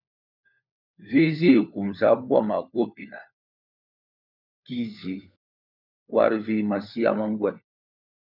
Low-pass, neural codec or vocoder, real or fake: 5.4 kHz; codec, 16 kHz, 4 kbps, FunCodec, trained on LibriTTS, 50 frames a second; fake